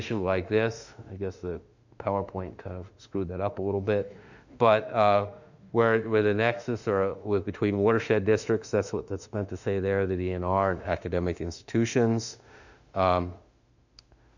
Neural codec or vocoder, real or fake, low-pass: autoencoder, 48 kHz, 32 numbers a frame, DAC-VAE, trained on Japanese speech; fake; 7.2 kHz